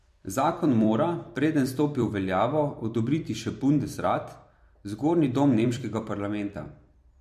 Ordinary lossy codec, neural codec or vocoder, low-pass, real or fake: MP3, 64 kbps; vocoder, 44.1 kHz, 128 mel bands every 256 samples, BigVGAN v2; 14.4 kHz; fake